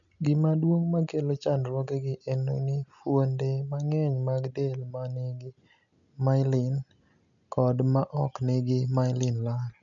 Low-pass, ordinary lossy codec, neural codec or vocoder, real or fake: 7.2 kHz; none; none; real